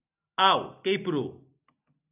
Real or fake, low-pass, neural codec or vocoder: real; 3.6 kHz; none